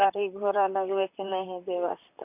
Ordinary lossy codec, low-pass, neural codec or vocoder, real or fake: none; 3.6 kHz; vocoder, 44.1 kHz, 128 mel bands, Pupu-Vocoder; fake